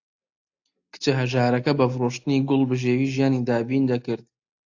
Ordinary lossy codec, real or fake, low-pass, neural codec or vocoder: AAC, 48 kbps; real; 7.2 kHz; none